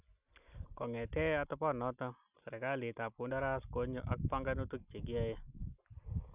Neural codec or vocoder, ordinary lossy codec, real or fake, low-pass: none; none; real; 3.6 kHz